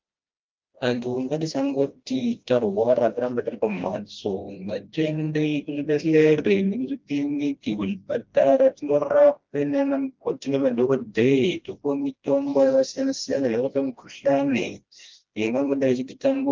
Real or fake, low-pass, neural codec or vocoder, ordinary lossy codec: fake; 7.2 kHz; codec, 16 kHz, 1 kbps, FreqCodec, smaller model; Opus, 24 kbps